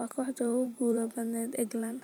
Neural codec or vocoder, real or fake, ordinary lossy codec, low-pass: vocoder, 44.1 kHz, 128 mel bands every 512 samples, BigVGAN v2; fake; none; none